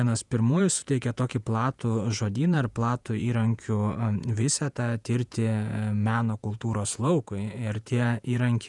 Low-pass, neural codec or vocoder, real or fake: 10.8 kHz; vocoder, 48 kHz, 128 mel bands, Vocos; fake